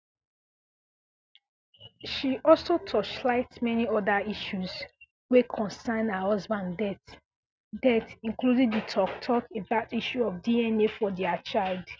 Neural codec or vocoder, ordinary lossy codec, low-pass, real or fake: none; none; none; real